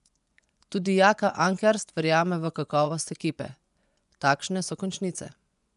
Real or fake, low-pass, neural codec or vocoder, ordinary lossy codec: fake; 10.8 kHz; vocoder, 24 kHz, 100 mel bands, Vocos; none